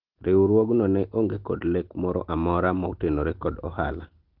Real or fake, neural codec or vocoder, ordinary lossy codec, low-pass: real; none; Opus, 16 kbps; 5.4 kHz